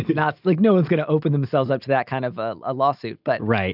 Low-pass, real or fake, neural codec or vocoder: 5.4 kHz; real; none